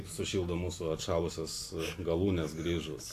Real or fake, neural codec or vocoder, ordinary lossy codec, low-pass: real; none; AAC, 64 kbps; 14.4 kHz